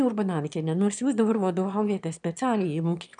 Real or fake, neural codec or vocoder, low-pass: fake; autoencoder, 22.05 kHz, a latent of 192 numbers a frame, VITS, trained on one speaker; 9.9 kHz